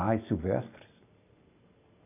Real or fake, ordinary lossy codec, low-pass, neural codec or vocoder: real; none; 3.6 kHz; none